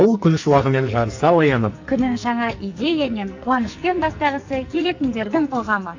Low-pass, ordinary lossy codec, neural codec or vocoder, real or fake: 7.2 kHz; AAC, 48 kbps; codec, 44.1 kHz, 2.6 kbps, SNAC; fake